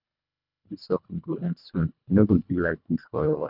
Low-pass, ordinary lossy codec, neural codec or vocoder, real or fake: 5.4 kHz; none; codec, 24 kHz, 1.5 kbps, HILCodec; fake